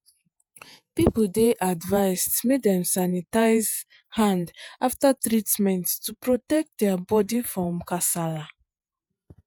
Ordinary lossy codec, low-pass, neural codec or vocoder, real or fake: none; none; vocoder, 48 kHz, 128 mel bands, Vocos; fake